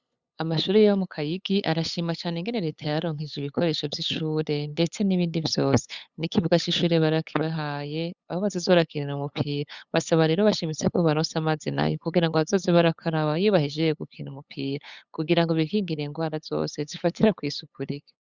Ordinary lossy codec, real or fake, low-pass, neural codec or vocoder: Opus, 64 kbps; fake; 7.2 kHz; codec, 16 kHz, 8 kbps, FunCodec, trained on LibriTTS, 25 frames a second